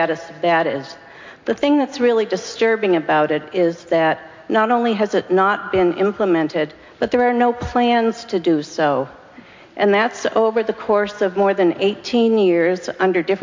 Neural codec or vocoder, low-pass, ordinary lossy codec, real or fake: none; 7.2 kHz; MP3, 48 kbps; real